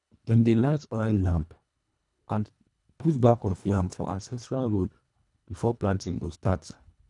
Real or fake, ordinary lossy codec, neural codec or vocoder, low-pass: fake; none; codec, 24 kHz, 1.5 kbps, HILCodec; 10.8 kHz